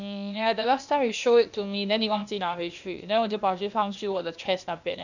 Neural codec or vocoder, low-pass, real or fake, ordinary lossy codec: codec, 16 kHz, 0.8 kbps, ZipCodec; 7.2 kHz; fake; none